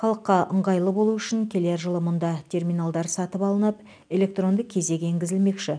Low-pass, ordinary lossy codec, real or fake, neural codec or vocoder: 9.9 kHz; none; real; none